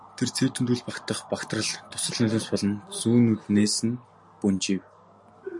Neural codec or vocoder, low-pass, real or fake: none; 10.8 kHz; real